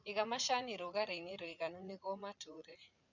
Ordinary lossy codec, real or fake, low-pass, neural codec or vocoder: none; fake; 7.2 kHz; vocoder, 44.1 kHz, 80 mel bands, Vocos